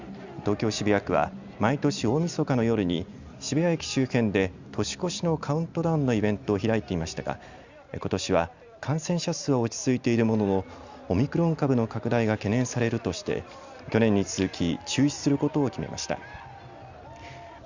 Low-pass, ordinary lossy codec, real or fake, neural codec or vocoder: 7.2 kHz; Opus, 64 kbps; real; none